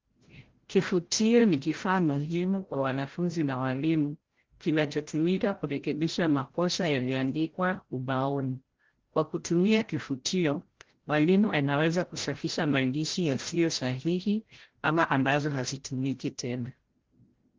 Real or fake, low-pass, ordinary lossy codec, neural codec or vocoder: fake; 7.2 kHz; Opus, 16 kbps; codec, 16 kHz, 0.5 kbps, FreqCodec, larger model